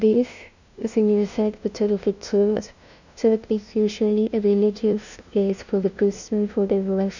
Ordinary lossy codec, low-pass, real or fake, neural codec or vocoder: none; 7.2 kHz; fake; codec, 16 kHz, 0.5 kbps, FunCodec, trained on LibriTTS, 25 frames a second